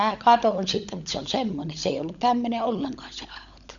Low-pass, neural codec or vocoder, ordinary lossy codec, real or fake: 7.2 kHz; codec, 16 kHz, 16 kbps, FunCodec, trained on LibriTTS, 50 frames a second; none; fake